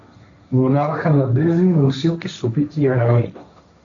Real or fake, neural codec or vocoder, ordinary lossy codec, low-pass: fake; codec, 16 kHz, 1.1 kbps, Voila-Tokenizer; MP3, 64 kbps; 7.2 kHz